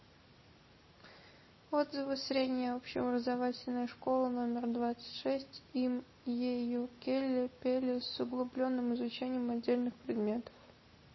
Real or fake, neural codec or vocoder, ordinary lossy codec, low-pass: real; none; MP3, 24 kbps; 7.2 kHz